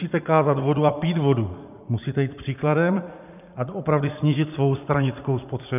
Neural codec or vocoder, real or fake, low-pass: vocoder, 44.1 kHz, 80 mel bands, Vocos; fake; 3.6 kHz